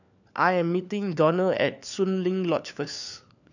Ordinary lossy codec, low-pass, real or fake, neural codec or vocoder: none; 7.2 kHz; fake; codec, 16 kHz, 4 kbps, FunCodec, trained on LibriTTS, 50 frames a second